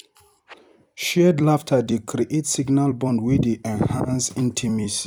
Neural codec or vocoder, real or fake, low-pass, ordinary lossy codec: vocoder, 44.1 kHz, 128 mel bands every 256 samples, BigVGAN v2; fake; 19.8 kHz; none